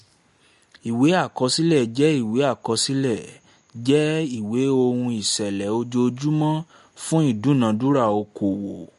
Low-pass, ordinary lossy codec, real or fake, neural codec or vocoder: 14.4 kHz; MP3, 48 kbps; real; none